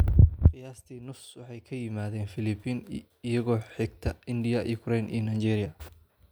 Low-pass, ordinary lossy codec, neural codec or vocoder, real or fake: none; none; none; real